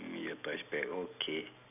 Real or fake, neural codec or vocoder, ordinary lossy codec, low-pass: real; none; none; 3.6 kHz